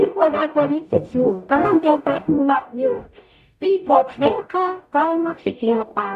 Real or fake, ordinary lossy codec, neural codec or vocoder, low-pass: fake; none; codec, 44.1 kHz, 0.9 kbps, DAC; 14.4 kHz